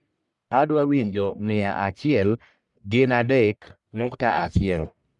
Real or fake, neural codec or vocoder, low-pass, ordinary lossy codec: fake; codec, 44.1 kHz, 1.7 kbps, Pupu-Codec; 10.8 kHz; none